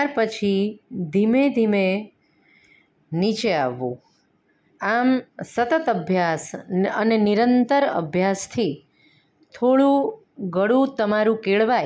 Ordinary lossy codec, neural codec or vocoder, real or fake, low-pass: none; none; real; none